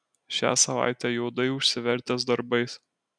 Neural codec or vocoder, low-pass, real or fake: none; 9.9 kHz; real